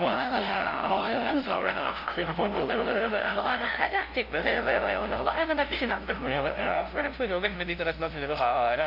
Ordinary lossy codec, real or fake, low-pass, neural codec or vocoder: AAC, 48 kbps; fake; 5.4 kHz; codec, 16 kHz, 0.5 kbps, FunCodec, trained on LibriTTS, 25 frames a second